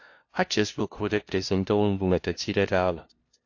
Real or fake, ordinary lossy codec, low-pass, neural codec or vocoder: fake; AAC, 48 kbps; 7.2 kHz; codec, 16 kHz, 0.5 kbps, FunCodec, trained on LibriTTS, 25 frames a second